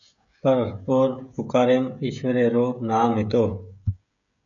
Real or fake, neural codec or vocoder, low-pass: fake; codec, 16 kHz, 16 kbps, FreqCodec, smaller model; 7.2 kHz